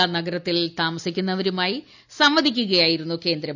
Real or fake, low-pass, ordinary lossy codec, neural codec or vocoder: real; 7.2 kHz; none; none